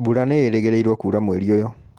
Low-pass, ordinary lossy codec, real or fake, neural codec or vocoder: 19.8 kHz; Opus, 16 kbps; real; none